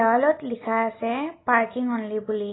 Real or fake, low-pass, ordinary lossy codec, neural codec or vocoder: real; 7.2 kHz; AAC, 16 kbps; none